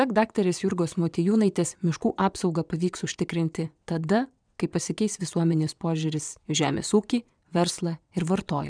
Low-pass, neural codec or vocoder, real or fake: 9.9 kHz; vocoder, 22.05 kHz, 80 mel bands, WaveNeXt; fake